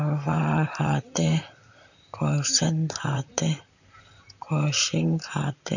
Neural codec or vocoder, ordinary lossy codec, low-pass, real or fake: vocoder, 44.1 kHz, 128 mel bands, Pupu-Vocoder; none; 7.2 kHz; fake